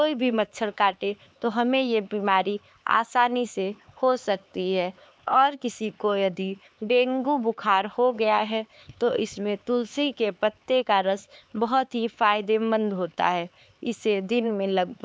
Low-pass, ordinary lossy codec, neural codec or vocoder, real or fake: none; none; codec, 16 kHz, 4 kbps, X-Codec, HuBERT features, trained on LibriSpeech; fake